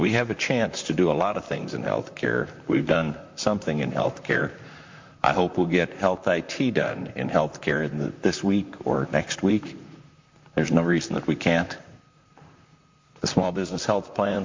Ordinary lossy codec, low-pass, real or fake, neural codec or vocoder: MP3, 48 kbps; 7.2 kHz; fake; vocoder, 44.1 kHz, 128 mel bands, Pupu-Vocoder